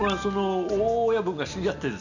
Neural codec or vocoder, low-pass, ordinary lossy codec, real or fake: none; 7.2 kHz; none; real